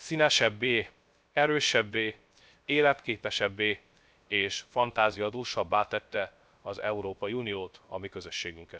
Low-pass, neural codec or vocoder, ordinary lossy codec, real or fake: none; codec, 16 kHz, 0.3 kbps, FocalCodec; none; fake